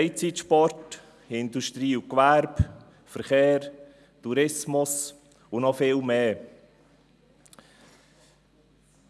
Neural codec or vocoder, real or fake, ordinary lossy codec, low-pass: none; real; none; none